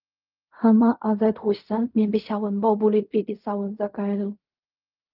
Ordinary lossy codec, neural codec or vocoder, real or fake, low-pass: Opus, 24 kbps; codec, 16 kHz in and 24 kHz out, 0.4 kbps, LongCat-Audio-Codec, fine tuned four codebook decoder; fake; 5.4 kHz